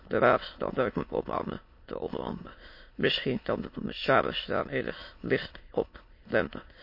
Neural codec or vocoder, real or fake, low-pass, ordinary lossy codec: autoencoder, 22.05 kHz, a latent of 192 numbers a frame, VITS, trained on many speakers; fake; 5.4 kHz; MP3, 32 kbps